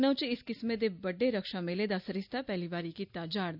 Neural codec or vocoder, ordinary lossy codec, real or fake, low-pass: none; none; real; 5.4 kHz